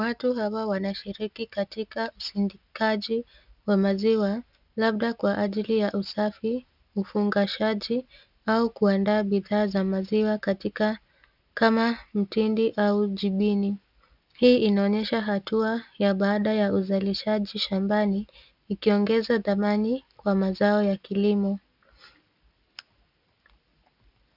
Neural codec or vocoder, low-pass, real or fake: none; 5.4 kHz; real